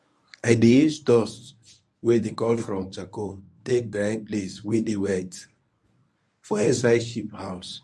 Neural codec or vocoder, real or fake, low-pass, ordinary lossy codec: codec, 24 kHz, 0.9 kbps, WavTokenizer, medium speech release version 1; fake; none; none